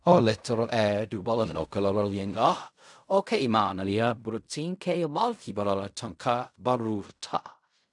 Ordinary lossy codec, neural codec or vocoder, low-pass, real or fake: none; codec, 16 kHz in and 24 kHz out, 0.4 kbps, LongCat-Audio-Codec, fine tuned four codebook decoder; 10.8 kHz; fake